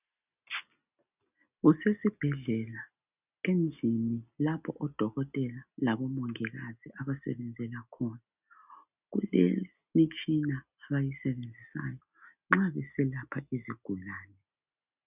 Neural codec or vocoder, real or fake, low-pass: none; real; 3.6 kHz